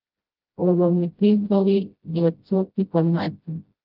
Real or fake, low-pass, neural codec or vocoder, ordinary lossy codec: fake; 5.4 kHz; codec, 16 kHz, 0.5 kbps, FreqCodec, smaller model; Opus, 32 kbps